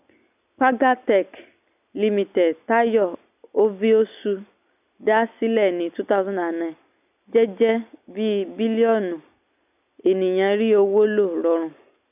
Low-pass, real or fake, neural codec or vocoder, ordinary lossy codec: 3.6 kHz; real; none; none